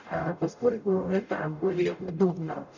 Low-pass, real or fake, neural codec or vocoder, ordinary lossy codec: 7.2 kHz; fake; codec, 44.1 kHz, 0.9 kbps, DAC; none